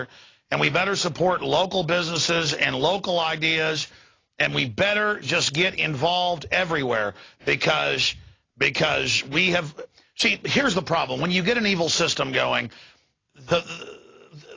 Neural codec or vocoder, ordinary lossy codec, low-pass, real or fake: none; AAC, 32 kbps; 7.2 kHz; real